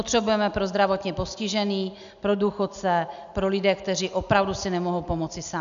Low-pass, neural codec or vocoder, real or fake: 7.2 kHz; none; real